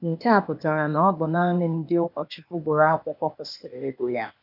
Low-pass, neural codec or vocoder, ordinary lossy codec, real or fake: 5.4 kHz; codec, 16 kHz, 0.8 kbps, ZipCodec; none; fake